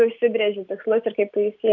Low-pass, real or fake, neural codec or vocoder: 7.2 kHz; real; none